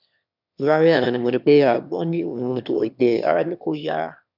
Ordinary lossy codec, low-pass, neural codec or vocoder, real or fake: none; 5.4 kHz; autoencoder, 22.05 kHz, a latent of 192 numbers a frame, VITS, trained on one speaker; fake